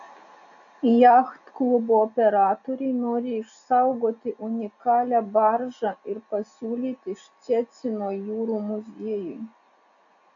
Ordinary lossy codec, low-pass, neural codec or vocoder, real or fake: MP3, 96 kbps; 7.2 kHz; none; real